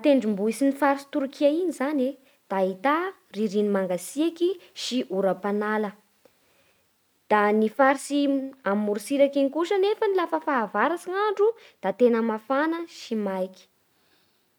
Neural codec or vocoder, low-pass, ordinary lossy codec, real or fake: none; none; none; real